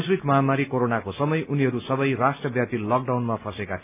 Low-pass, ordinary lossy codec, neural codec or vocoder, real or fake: 3.6 kHz; AAC, 32 kbps; none; real